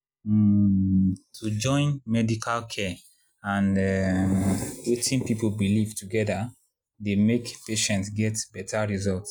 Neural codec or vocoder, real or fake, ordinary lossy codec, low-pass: none; real; none; none